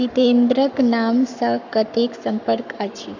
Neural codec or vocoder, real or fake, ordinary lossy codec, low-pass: codec, 44.1 kHz, 7.8 kbps, Pupu-Codec; fake; none; 7.2 kHz